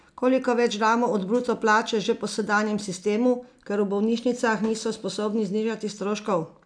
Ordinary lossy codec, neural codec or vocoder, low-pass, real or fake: none; none; 9.9 kHz; real